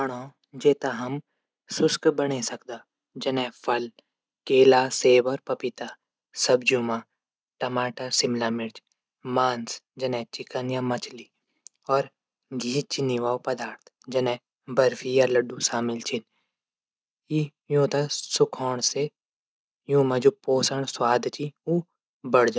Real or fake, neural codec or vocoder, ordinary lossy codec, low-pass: real; none; none; none